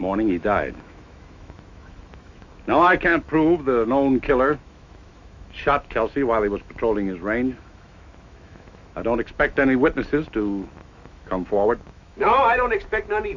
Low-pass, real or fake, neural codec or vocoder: 7.2 kHz; fake; autoencoder, 48 kHz, 128 numbers a frame, DAC-VAE, trained on Japanese speech